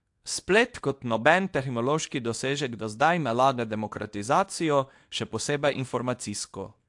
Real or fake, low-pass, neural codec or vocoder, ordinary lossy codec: fake; 10.8 kHz; codec, 24 kHz, 0.9 kbps, WavTokenizer, small release; AAC, 64 kbps